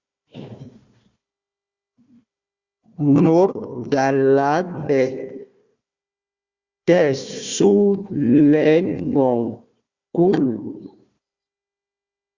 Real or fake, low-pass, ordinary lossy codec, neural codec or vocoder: fake; 7.2 kHz; Opus, 64 kbps; codec, 16 kHz, 1 kbps, FunCodec, trained on Chinese and English, 50 frames a second